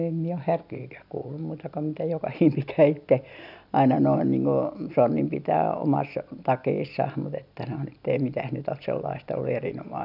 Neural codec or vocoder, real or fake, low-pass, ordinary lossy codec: none; real; 5.4 kHz; none